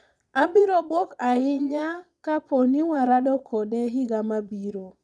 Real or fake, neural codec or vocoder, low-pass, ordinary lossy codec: fake; vocoder, 22.05 kHz, 80 mel bands, Vocos; none; none